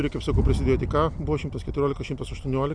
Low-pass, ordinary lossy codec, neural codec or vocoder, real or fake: 9.9 kHz; Opus, 64 kbps; none; real